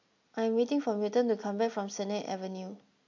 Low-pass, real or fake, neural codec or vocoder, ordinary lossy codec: 7.2 kHz; real; none; MP3, 48 kbps